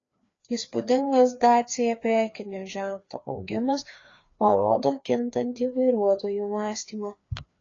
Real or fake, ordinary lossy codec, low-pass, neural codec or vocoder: fake; AAC, 32 kbps; 7.2 kHz; codec, 16 kHz, 2 kbps, FreqCodec, larger model